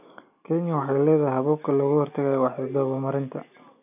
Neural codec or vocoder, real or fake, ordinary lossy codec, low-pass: none; real; none; 3.6 kHz